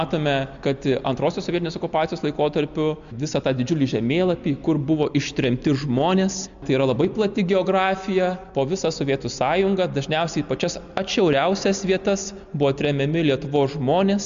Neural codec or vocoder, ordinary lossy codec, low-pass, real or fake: none; MP3, 64 kbps; 7.2 kHz; real